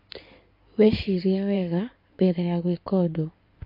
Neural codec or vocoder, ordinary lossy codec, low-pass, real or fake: codec, 16 kHz in and 24 kHz out, 2.2 kbps, FireRedTTS-2 codec; MP3, 32 kbps; 5.4 kHz; fake